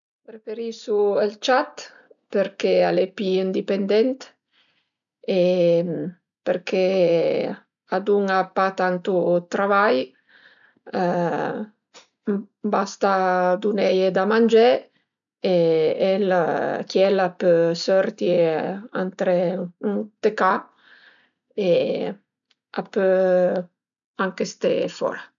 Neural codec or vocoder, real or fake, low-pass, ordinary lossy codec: none; real; 7.2 kHz; none